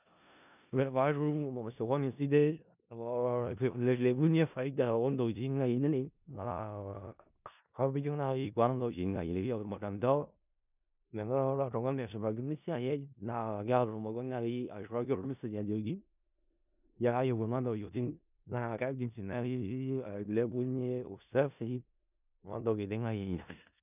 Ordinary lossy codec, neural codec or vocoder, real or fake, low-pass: none; codec, 16 kHz in and 24 kHz out, 0.4 kbps, LongCat-Audio-Codec, four codebook decoder; fake; 3.6 kHz